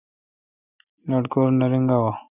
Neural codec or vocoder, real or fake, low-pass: none; real; 3.6 kHz